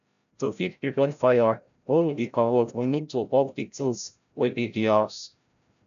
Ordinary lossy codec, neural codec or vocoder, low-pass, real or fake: none; codec, 16 kHz, 0.5 kbps, FreqCodec, larger model; 7.2 kHz; fake